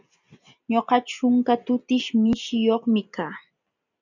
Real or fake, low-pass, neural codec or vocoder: real; 7.2 kHz; none